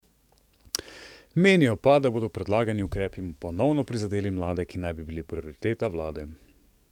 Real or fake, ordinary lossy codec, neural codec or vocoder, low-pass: fake; none; codec, 44.1 kHz, 7.8 kbps, DAC; 19.8 kHz